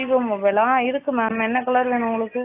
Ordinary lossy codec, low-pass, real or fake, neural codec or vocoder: AAC, 24 kbps; 3.6 kHz; real; none